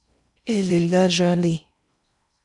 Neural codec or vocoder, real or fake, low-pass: codec, 16 kHz in and 24 kHz out, 0.6 kbps, FocalCodec, streaming, 4096 codes; fake; 10.8 kHz